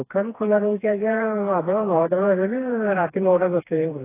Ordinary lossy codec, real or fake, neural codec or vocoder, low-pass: AAC, 16 kbps; fake; codec, 16 kHz, 2 kbps, FreqCodec, smaller model; 3.6 kHz